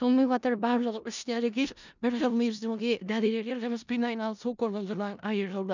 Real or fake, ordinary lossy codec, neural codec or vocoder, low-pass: fake; none; codec, 16 kHz in and 24 kHz out, 0.4 kbps, LongCat-Audio-Codec, four codebook decoder; 7.2 kHz